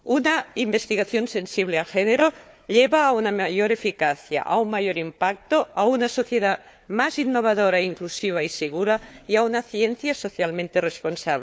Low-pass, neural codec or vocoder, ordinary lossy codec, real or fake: none; codec, 16 kHz, 4 kbps, FunCodec, trained on Chinese and English, 50 frames a second; none; fake